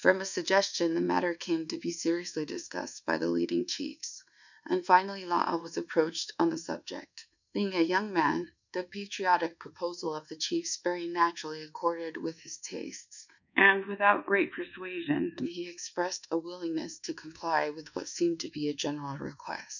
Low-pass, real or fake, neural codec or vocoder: 7.2 kHz; fake; codec, 24 kHz, 1.2 kbps, DualCodec